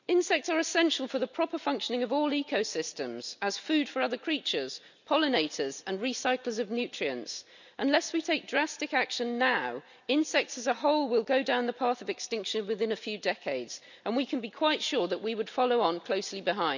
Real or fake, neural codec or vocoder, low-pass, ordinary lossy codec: real; none; 7.2 kHz; none